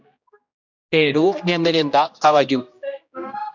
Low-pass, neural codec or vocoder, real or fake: 7.2 kHz; codec, 16 kHz, 1 kbps, X-Codec, HuBERT features, trained on general audio; fake